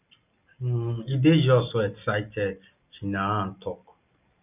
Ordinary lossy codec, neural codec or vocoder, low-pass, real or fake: none; none; 3.6 kHz; real